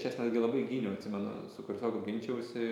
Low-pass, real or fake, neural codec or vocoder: 19.8 kHz; fake; vocoder, 44.1 kHz, 128 mel bands every 512 samples, BigVGAN v2